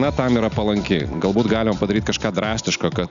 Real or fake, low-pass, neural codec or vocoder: real; 7.2 kHz; none